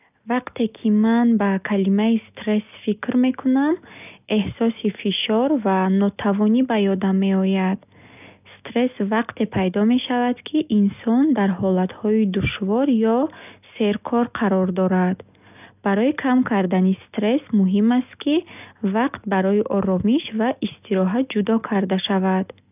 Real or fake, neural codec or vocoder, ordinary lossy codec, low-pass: real; none; none; 3.6 kHz